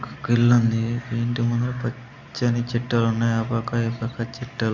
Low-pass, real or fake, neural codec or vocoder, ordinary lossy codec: 7.2 kHz; real; none; Opus, 64 kbps